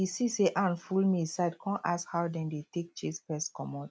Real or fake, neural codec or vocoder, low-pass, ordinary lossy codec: real; none; none; none